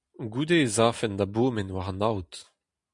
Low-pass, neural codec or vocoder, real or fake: 10.8 kHz; none; real